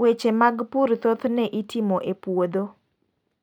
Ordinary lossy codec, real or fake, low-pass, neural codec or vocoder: none; real; 19.8 kHz; none